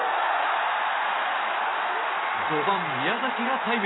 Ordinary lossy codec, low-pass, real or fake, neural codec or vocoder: AAC, 16 kbps; 7.2 kHz; real; none